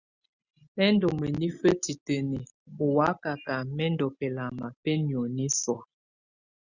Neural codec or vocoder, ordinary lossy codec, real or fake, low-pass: none; Opus, 64 kbps; real; 7.2 kHz